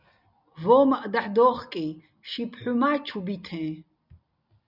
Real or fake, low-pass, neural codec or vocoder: real; 5.4 kHz; none